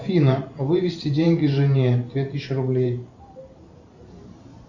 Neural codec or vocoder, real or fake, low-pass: vocoder, 44.1 kHz, 128 mel bands every 512 samples, BigVGAN v2; fake; 7.2 kHz